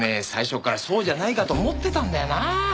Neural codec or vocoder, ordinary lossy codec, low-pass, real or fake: none; none; none; real